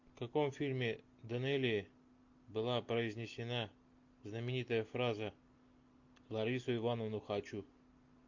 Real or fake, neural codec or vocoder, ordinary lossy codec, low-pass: real; none; MP3, 48 kbps; 7.2 kHz